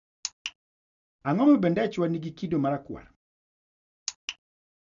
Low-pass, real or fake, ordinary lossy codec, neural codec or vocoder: 7.2 kHz; real; none; none